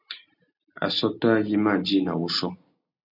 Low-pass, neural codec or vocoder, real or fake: 5.4 kHz; none; real